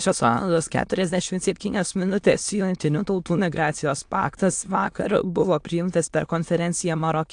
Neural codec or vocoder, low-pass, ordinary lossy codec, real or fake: autoencoder, 22.05 kHz, a latent of 192 numbers a frame, VITS, trained on many speakers; 9.9 kHz; AAC, 64 kbps; fake